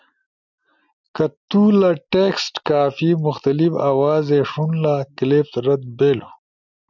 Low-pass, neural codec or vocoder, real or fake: 7.2 kHz; none; real